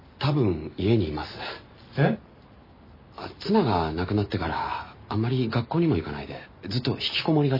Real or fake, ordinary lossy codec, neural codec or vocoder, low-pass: real; MP3, 24 kbps; none; 5.4 kHz